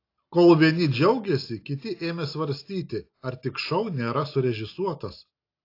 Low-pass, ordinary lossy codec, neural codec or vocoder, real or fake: 5.4 kHz; AAC, 32 kbps; none; real